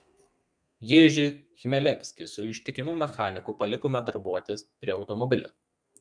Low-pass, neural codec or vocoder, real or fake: 9.9 kHz; codec, 32 kHz, 1.9 kbps, SNAC; fake